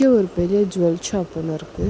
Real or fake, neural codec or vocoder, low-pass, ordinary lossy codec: real; none; none; none